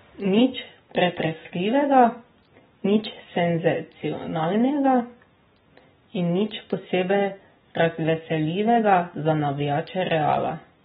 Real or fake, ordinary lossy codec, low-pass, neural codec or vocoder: real; AAC, 16 kbps; 19.8 kHz; none